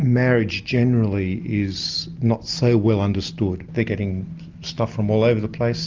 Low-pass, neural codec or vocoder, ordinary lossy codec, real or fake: 7.2 kHz; none; Opus, 24 kbps; real